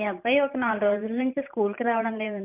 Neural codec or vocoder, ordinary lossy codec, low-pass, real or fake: vocoder, 44.1 kHz, 128 mel bands every 512 samples, BigVGAN v2; none; 3.6 kHz; fake